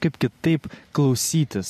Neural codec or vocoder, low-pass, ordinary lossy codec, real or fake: none; 14.4 kHz; MP3, 64 kbps; real